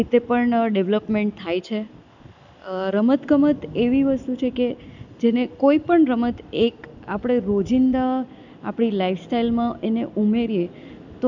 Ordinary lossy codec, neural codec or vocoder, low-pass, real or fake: none; none; 7.2 kHz; real